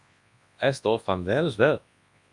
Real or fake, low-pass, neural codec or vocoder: fake; 10.8 kHz; codec, 24 kHz, 0.9 kbps, WavTokenizer, large speech release